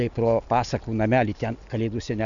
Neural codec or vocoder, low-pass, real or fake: none; 7.2 kHz; real